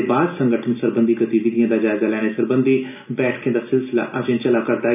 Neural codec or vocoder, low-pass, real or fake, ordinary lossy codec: none; 3.6 kHz; real; none